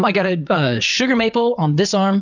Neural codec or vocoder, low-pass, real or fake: vocoder, 22.05 kHz, 80 mel bands, WaveNeXt; 7.2 kHz; fake